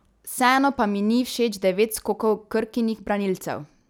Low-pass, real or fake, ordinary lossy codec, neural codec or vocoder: none; real; none; none